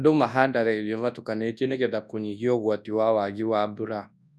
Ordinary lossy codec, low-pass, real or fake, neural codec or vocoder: none; none; fake; codec, 24 kHz, 0.9 kbps, WavTokenizer, large speech release